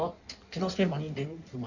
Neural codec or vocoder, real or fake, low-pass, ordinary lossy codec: codec, 16 kHz in and 24 kHz out, 1.1 kbps, FireRedTTS-2 codec; fake; 7.2 kHz; none